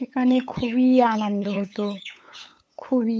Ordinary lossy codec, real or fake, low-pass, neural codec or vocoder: none; fake; none; codec, 16 kHz, 8 kbps, FunCodec, trained on LibriTTS, 25 frames a second